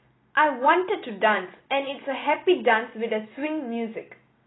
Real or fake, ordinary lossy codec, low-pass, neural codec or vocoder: real; AAC, 16 kbps; 7.2 kHz; none